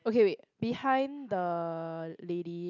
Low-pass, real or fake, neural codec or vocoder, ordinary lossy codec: 7.2 kHz; real; none; none